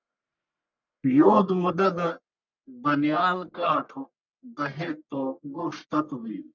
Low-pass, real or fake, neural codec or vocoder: 7.2 kHz; fake; codec, 44.1 kHz, 1.7 kbps, Pupu-Codec